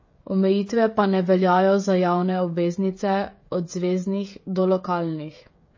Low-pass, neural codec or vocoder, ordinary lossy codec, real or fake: 7.2 kHz; codec, 16 kHz, 16 kbps, FreqCodec, smaller model; MP3, 32 kbps; fake